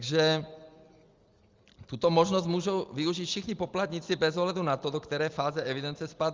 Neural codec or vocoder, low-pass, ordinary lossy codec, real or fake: none; 7.2 kHz; Opus, 32 kbps; real